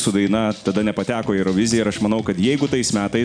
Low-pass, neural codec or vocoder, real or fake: 9.9 kHz; none; real